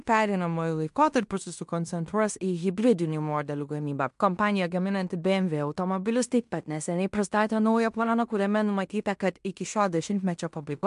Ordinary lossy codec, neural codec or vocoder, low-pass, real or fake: MP3, 64 kbps; codec, 16 kHz in and 24 kHz out, 0.9 kbps, LongCat-Audio-Codec, fine tuned four codebook decoder; 10.8 kHz; fake